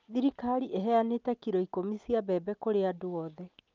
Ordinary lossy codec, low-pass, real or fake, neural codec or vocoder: Opus, 24 kbps; 7.2 kHz; real; none